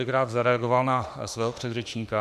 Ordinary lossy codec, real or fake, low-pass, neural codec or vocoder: MP3, 96 kbps; fake; 14.4 kHz; autoencoder, 48 kHz, 32 numbers a frame, DAC-VAE, trained on Japanese speech